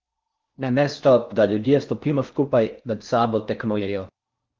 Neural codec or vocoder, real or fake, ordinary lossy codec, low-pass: codec, 16 kHz in and 24 kHz out, 0.6 kbps, FocalCodec, streaming, 4096 codes; fake; Opus, 32 kbps; 7.2 kHz